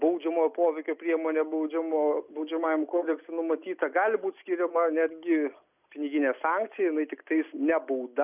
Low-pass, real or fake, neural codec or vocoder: 3.6 kHz; real; none